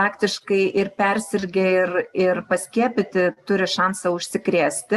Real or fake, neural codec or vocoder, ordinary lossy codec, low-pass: real; none; Opus, 64 kbps; 14.4 kHz